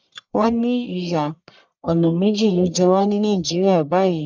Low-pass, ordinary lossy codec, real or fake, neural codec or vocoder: 7.2 kHz; none; fake; codec, 44.1 kHz, 1.7 kbps, Pupu-Codec